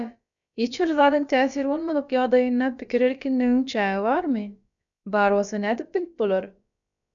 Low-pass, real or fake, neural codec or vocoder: 7.2 kHz; fake; codec, 16 kHz, about 1 kbps, DyCAST, with the encoder's durations